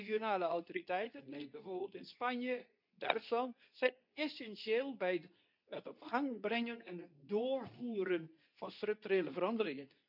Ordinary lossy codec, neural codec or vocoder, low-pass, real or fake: none; codec, 24 kHz, 0.9 kbps, WavTokenizer, medium speech release version 2; 5.4 kHz; fake